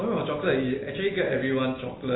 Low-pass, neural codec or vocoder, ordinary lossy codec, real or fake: 7.2 kHz; none; AAC, 16 kbps; real